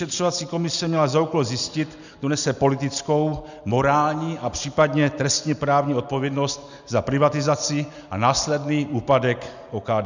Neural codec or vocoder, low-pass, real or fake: none; 7.2 kHz; real